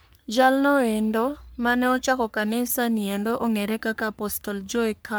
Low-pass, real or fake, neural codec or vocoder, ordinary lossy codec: none; fake; codec, 44.1 kHz, 3.4 kbps, Pupu-Codec; none